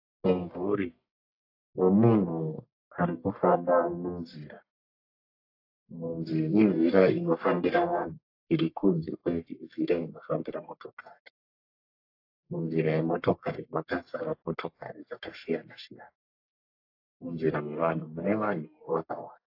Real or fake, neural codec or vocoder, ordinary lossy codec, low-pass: fake; codec, 44.1 kHz, 1.7 kbps, Pupu-Codec; AAC, 32 kbps; 5.4 kHz